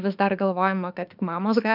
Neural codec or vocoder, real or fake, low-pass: autoencoder, 48 kHz, 32 numbers a frame, DAC-VAE, trained on Japanese speech; fake; 5.4 kHz